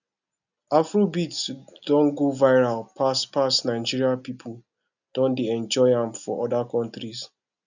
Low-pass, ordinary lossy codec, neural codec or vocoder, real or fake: 7.2 kHz; none; none; real